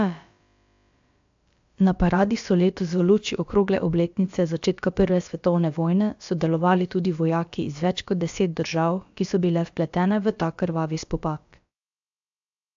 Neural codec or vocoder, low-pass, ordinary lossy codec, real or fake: codec, 16 kHz, about 1 kbps, DyCAST, with the encoder's durations; 7.2 kHz; AAC, 64 kbps; fake